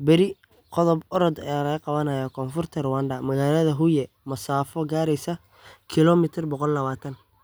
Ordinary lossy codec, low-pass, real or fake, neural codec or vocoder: none; none; real; none